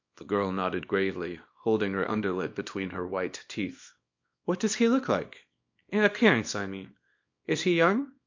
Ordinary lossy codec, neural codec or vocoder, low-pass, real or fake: MP3, 48 kbps; codec, 24 kHz, 0.9 kbps, WavTokenizer, small release; 7.2 kHz; fake